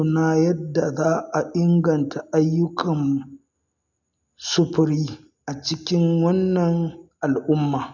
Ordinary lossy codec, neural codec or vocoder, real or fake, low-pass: none; none; real; 7.2 kHz